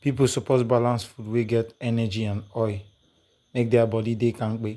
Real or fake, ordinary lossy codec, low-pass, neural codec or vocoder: real; none; none; none